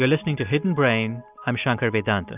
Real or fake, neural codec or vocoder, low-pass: real; none; 3.6 kHz